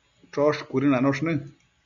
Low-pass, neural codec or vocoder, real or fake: 7.2 kHz; none; real